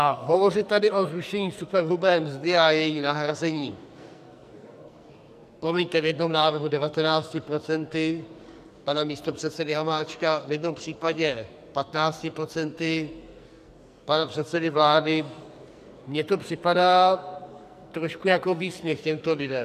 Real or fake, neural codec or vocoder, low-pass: fake; codec, 44.1 kHz, 2.6 kbps, SNAC; 14.4 kHz